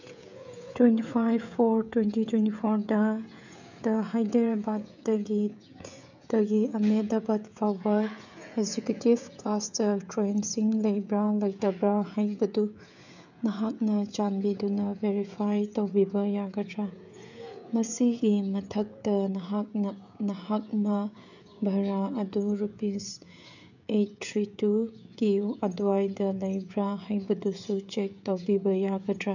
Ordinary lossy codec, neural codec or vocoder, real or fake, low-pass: none; codec, 16 kHz, 16 kbps, FreqCodec, smaller model; fake; 7.2 kHz